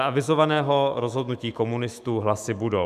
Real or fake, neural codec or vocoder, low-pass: fake; autoencoder, 48 kHz, 128 numbers a frame, DAC-VAE, trained on Japanese speech; 14.4 kHz